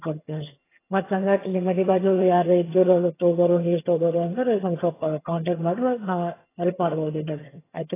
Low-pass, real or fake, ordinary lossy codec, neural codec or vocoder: 3.6 kHz; fake; AAC, 16 kbps; vocoder, 22.05 kHz, 80 mel bands, HiFi-GAN